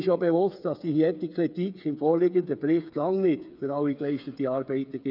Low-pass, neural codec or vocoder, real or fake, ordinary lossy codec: 5.4 kHz; codec, 16 kHz, 8 kbps, FreqCodec, smaller model; fake; none